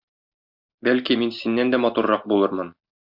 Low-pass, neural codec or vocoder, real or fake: 5.4 kHz; none; real